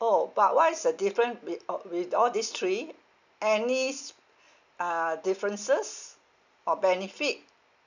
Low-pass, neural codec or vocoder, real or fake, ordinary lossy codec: 7.2 kHz; none; real; none